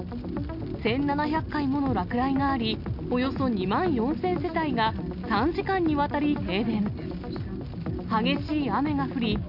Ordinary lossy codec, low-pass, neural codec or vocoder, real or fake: none; 5.4 kHz; none; real